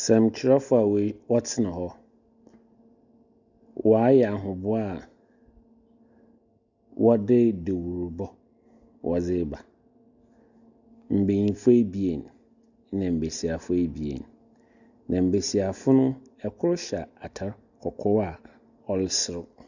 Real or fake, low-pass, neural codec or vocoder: real; 7.2 kHz; none